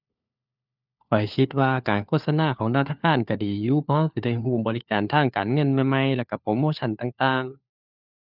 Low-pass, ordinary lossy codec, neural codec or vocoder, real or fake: 5.4 kHz; none; codec, 16 kHz, 4 kbps, FunCodec, trained on LibriTTS, 50 frames a second; fake